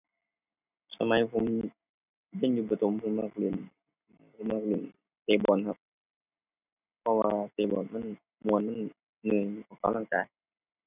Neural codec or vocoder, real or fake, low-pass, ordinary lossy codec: none; real; 3.6 kHz; none